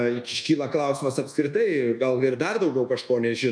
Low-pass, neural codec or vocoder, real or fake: 9.9 kHz; codec, 24 kHz, 1.2 kbps, DualCodec; fake